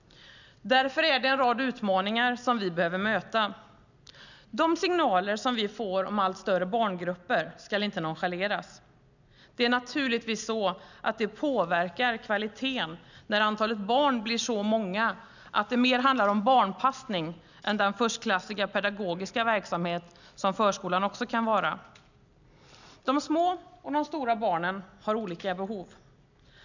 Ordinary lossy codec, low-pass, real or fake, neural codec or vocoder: none; 7.2 kHz; real; none